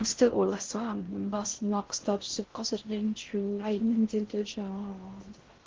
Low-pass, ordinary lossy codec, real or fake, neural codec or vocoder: 7.2 kHz; Opus, 16 kbps; fake; codec, 16 kHz in and 24 kHz out, 0.6 kbps, FocalCodec, streaming, 4096 codes